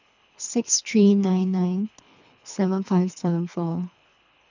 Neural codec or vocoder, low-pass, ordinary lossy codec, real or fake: codec, 24 kHz, 3 kbps, HILCodec; 7.2 kHz; none; fake